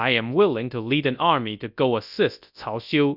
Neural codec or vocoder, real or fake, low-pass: codec, 24 kHz, 0.9 kbps, WavTokenizer, large speech release; fake; 5.4 kHz